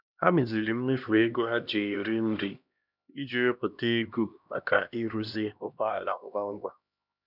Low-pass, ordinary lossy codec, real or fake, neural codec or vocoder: 5.4 kHz; none; fake; codec, 16 kHz, 1 kbps, X-Codec, HuBERT features, trained on LibriSpeech